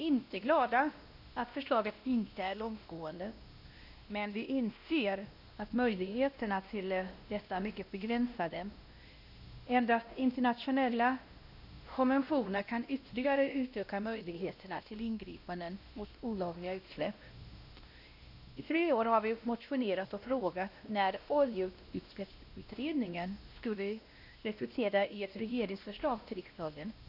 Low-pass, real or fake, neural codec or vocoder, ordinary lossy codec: 5.4 kHz; fake; codec, 16 kHz, 1 kbps, X-Codec, WavLM features, trained on Multilingual LibriSpeech; none